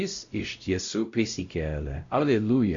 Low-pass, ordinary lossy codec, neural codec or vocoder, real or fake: 7.2 kHz; Opus, 64 kbps; codec, 16 kHz, 0.5 kbps, X-Codec, WavLM features, trained on Multilingual LibriSpeech; fake